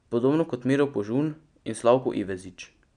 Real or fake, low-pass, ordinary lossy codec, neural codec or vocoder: real; 9.9 kHz; none; none